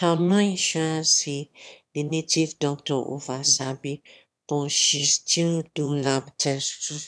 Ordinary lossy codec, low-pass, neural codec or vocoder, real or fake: none; none; autoencoder, 22.05 kHz, a latent of 192 numbers a frame, VITS, trained on one speaker; fake